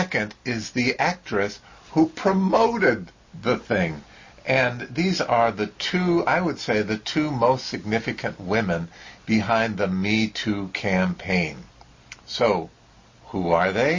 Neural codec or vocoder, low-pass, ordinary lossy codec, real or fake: none; 7.2 kHz; MP3, 32 kbps; real